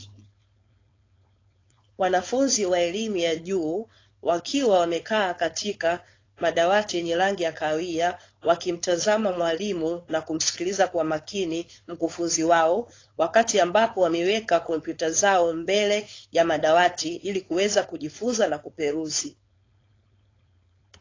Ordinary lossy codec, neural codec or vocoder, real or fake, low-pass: AAC, 32 kbps; codec, 16 kHz, 4.8 kbps, FACodec; fake; 7.2 kHz